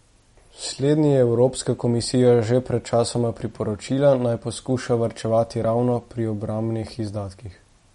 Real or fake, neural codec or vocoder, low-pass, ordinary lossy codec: real; none; 19.8 kHz; MP3, 48 kbps